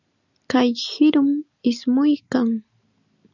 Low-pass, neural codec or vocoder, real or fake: 7.2 kHz; none; real